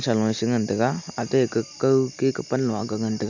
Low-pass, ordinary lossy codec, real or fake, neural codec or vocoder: 7.2 kHz; none; real; none